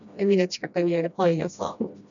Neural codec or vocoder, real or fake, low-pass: codec, 16 kHz, 1 kbps, FreqCodec, smaller model; fake; 7.2 kHz